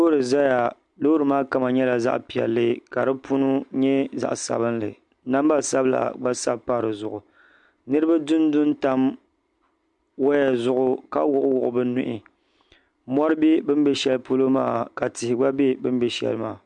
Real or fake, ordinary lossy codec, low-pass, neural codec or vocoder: real; MP3, 64 kbps; 10.8 kHz; none